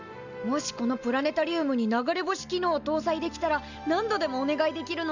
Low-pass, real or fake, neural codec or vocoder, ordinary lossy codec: 7.2 kHz; real; none; none